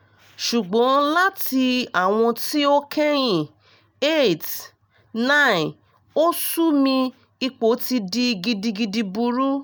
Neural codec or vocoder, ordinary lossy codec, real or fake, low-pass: none; none; real; none